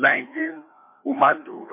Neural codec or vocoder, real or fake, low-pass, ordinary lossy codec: codec, 16 kHz, 2 kbps, FreqCodec, larger model; fake; 3.6 kHz; MP3, 24 kbps